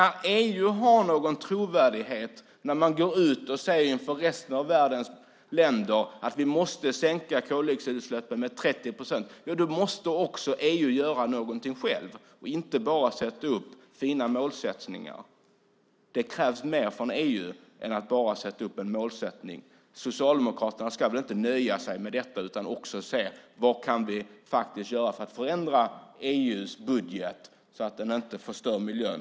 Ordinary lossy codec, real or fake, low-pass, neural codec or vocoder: none; real; none; none